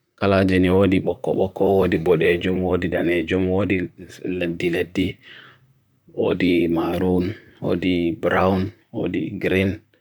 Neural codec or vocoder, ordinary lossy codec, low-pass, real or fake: vocoder, 44.1 kHz, 128 mel bands, Pupu-Vocoder; none; none; fake